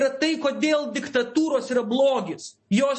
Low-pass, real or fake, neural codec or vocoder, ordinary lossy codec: 10.8 kHz; real; none; MP3, 32 kbps